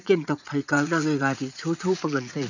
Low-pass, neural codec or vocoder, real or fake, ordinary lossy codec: 7.2 kHz; vocoder, 44.1 kHz, 80 mel bands, Vocos; fake; none